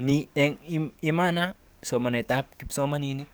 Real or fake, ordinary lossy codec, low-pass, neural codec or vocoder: fake; none; none; codec, 44.1 kHz, 7.8 kbps, DAC